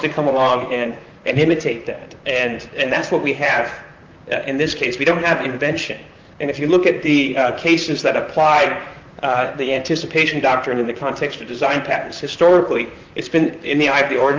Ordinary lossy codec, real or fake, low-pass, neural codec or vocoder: Opus, 24 kbps; fake; 7.2 kHz; vocoder, 44.1 kHz, 128 mel bands, Pupu-Vocoder